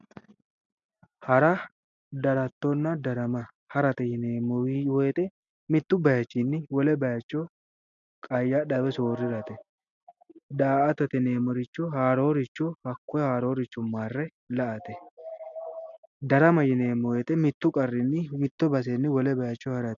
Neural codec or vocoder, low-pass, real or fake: none; 7.2 kHz; real